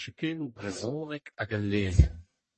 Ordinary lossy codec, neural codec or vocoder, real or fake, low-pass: MP3, 32 kbps; codec, 44.1 kHz, 1.7 kbps, Pupu-Codec; fake; 10.8 kHz